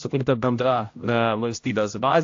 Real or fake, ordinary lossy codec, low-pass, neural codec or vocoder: fake; AAC, 48 kbps; 7.2 kHz; codec, 16 kHz, 0.5 kbps, X-Codec, HuBERT features, trained on general audio